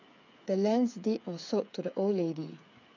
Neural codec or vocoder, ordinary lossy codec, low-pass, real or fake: codec, 16 kHz, 8 kbps, FreqCodec, smaller model; none; 7.2 kHz; fake